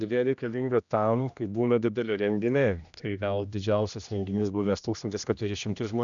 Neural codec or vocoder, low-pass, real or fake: codec, 16 kHz, 1 kbps, X-Codec, HuBERT features, trained on general audio; 7.2 kHz; fake